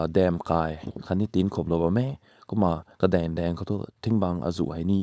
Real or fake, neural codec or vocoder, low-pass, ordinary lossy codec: fake; codec, 16 kHz, 4.8 kbps, FACodec; none; none